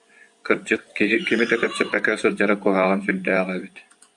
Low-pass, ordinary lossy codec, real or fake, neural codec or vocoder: 10.8 kHz; AAC, 64 kbps; fake; vocoder, 24 kHz, 100 mel bands, Vocos